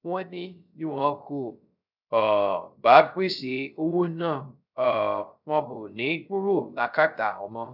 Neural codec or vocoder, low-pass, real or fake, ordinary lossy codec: codec, 16 kHz, 0.3 kbps, FocalCodec; 5.4 kHz; fake; none